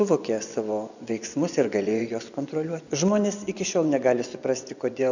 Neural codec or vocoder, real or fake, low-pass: vocoder, 24 kHz, 100 mel bands, Vocos; fake; 7.2 kHz